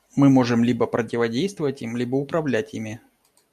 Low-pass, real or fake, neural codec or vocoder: 14.4 kHz; real; none